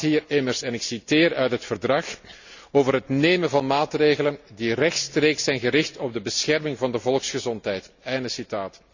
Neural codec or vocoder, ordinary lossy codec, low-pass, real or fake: none; none; 7.2 kHz; real